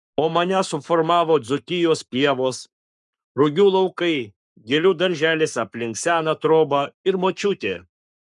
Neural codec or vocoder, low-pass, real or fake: codec, 44.1 kHz, 7.8 kbps, Pupu-Codec; 10.8 kHz; fake